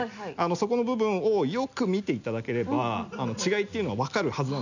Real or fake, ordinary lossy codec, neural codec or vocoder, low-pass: real; none; none; 7.2 kHz